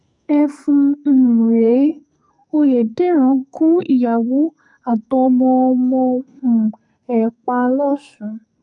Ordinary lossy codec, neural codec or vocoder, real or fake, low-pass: none; codec, 44.1 kHz, 2.6 kbps, SNAC; fake; 10.8 kHz